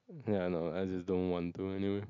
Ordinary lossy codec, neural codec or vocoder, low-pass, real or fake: none; none; 7.2 kHz; real